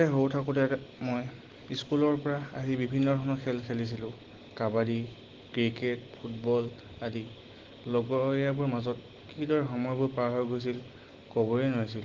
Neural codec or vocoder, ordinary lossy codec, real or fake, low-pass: none; Opus, 16 kbps; real; 7.2 kHz